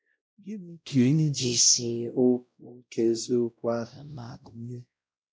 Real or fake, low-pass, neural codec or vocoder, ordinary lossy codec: fake; none; codec, 16 kHz, 0.5 kbps, X-Codec, WavLM features, trained on Multilingual LibriSpeech; none